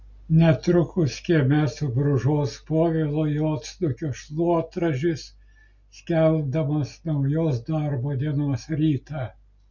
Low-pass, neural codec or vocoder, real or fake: 7.2 kHz; none; real